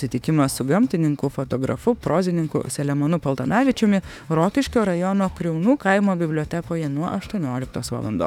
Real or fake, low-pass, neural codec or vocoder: fake; 19.8 kHz; autoencoder, 48 kHz, 32 numbers a frame, DAC-VAE, trained on Japanese speech